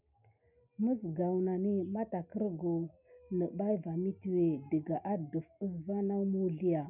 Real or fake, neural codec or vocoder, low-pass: real; none; 3.6 kHz